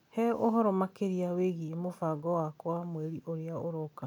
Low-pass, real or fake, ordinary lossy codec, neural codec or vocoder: 19.8 kHz; real; none; none